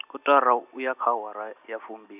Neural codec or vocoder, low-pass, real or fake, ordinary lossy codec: none; 3.6 kHz; real; none